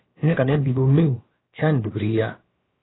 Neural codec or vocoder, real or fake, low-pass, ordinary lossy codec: codec, 16 kHz, about 1 kbps, DyCAST, with the encoder's durations; fake; 7.2 kHz; AAC, 16 kbps